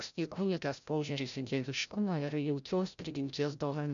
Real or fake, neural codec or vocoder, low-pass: fake; codec, 16 kHz, 0.5 kbps, FreqCodec, larger model; 7.2 kHz